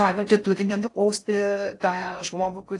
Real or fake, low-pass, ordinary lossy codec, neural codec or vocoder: fake; 10.8 kHz; AAC, 48 kbps; codec, 16 kHz in and 24 kHz out, 0.6 kbps, FocalCodec, streaming, 4096 codes